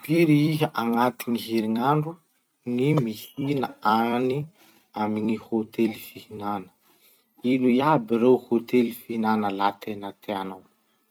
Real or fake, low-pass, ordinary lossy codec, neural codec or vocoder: fake; 19.8 kHz; none; vocoder, 44.1 kHz, 128 mel bands every 256 samples, BigVGAN v2